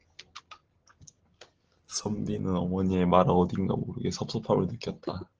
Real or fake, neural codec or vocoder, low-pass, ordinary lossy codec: real; none; 7.2 kHz; Opus, 16 kbps